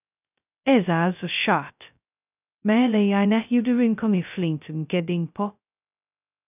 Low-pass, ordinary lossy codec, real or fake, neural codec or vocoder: 3.6 kHz; none; fake; codec, 16 kHz, 0.2 kbps, FocalCodec